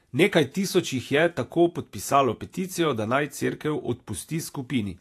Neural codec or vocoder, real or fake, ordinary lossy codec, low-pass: vocoder, 48 kHz, 128 mel bands, Vocos; fake; AAC, 64 kbps; 14.4 kHz